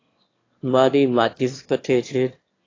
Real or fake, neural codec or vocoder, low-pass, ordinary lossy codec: fake; autoencoder, 22.05 kHz, a latent of 192 numbers a frame, VITS, trained on one speaker; 7.2 kHz; AAC, 32 kbps